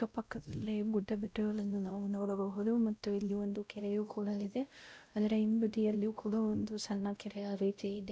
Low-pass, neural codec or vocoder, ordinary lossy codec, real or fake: none; codec, 16 kHz, 0.5 kbps, X-Codec, WavLM features, trained on Multilingual LibriSpeech; none; fake